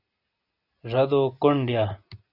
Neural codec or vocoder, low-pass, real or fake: none; 5.4 kHz; real